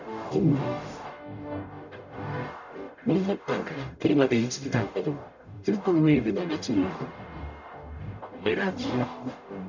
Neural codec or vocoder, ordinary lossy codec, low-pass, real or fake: codec, 44.1 kHz, 0.9 kbps, DAC; none; 7.2 kHz; fake